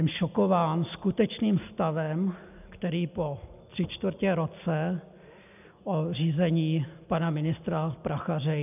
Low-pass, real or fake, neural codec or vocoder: 3.6 kHz; real; none